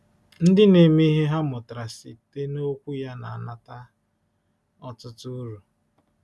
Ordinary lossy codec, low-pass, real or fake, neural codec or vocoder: none; none; real; none